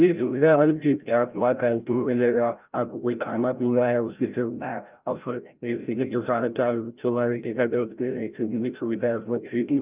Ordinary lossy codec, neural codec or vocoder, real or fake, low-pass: Opus, 24 kbps; codec, 16 kHz, 0.5 kbps, FreqCodec, larger model; fake; 3.6 kHz